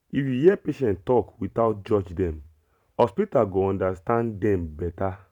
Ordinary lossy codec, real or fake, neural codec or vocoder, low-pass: none; fake; vocoder, 44.1 kHz, 128 mel bands every 512 samples, BigVGAN v2; 19.8 kHz